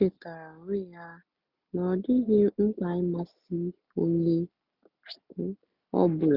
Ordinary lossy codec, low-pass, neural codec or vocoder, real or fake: none; 5.4 kHz; none; real